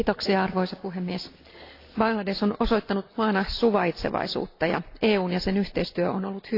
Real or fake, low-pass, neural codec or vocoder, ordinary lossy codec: real; 5.4 kHz; none; AAC, 32 kbps